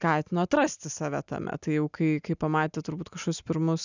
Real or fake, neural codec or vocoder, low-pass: real; none; 7.2 kHz